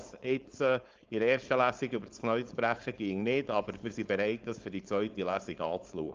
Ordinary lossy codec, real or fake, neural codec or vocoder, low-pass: Opus, 24 kbps; fake; codec, 16 kHz, 4.8 kbps, FACodec; 7.2 kHz